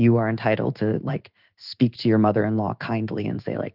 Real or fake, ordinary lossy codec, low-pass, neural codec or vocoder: fake; Opus, 24 kbps; 5.4 kHz; codec, 16 kHz in and 24 kHz out, 1 kbps, XY-Tokenizer